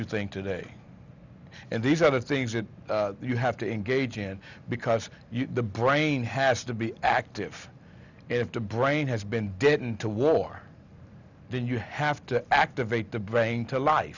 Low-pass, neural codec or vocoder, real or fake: 7.2 kHz; none; real